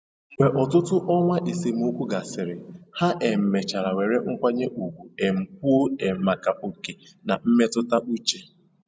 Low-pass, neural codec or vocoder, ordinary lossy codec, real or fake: none; none; none; real